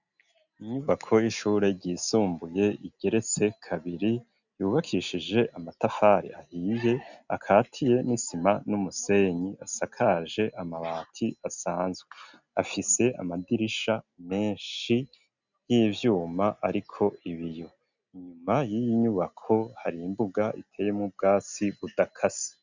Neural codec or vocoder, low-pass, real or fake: none; 7.2 kHz; real